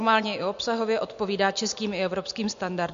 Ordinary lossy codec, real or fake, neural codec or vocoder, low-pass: MP3, 48 kbps; real; none; 7.2 kHz